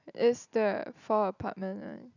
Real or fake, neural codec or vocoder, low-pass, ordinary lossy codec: real; none; 7.2 kHz; none